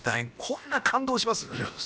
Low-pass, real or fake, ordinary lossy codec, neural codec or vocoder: none; fake; none; codec, 16 kHz, about 1 kbps, DyCAST, with the encoder's durations